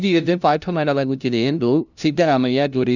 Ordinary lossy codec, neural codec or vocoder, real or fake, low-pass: none; codec, 16 kHz, 0.5 kbps, FunCodec, trained on LibriTTS, 25 frames a second; fake; 7.2 kHz